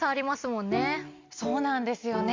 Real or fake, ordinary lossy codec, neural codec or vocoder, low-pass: real; MP3, 64 kbps; none; 7.2 kHz